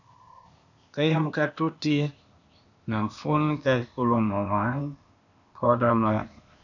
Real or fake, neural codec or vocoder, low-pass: fake; codec, 16 kHz, 0.8 kbps, ZipCodec; 7.2 kHz